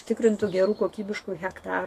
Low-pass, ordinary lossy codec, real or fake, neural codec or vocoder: 14.4 kHz; AAC, 64 kbps; fake; autoencoder, 48 kHz, 128 numbers a frame, DAC-VAE, trained on Japanese speech